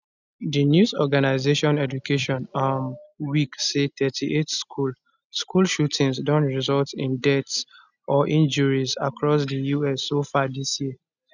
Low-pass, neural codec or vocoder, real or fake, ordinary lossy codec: 7.2 kHz; none; real; none